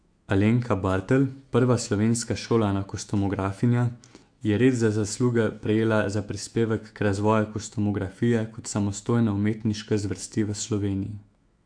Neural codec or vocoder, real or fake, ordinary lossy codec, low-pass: autoencoder, 48 kHz, 128 numbers a frame, DAC-VAE, trained on Japanese speech; fake; AAC, 64 kbps; 9.9 kHz